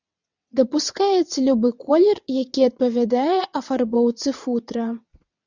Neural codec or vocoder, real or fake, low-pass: vocoder, 22.05 kHz, 80 mel bands, WaveNeXt; fake; 7.2 kHz